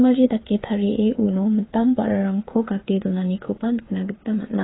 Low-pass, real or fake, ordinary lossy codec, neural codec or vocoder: 7.2 kHz; fake; AAC, 16 kbps; codec, 16 kHz, 8 kbps, FreqCodec, smaller model